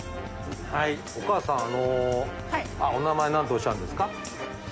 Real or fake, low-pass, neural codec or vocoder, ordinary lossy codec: real; none; none; none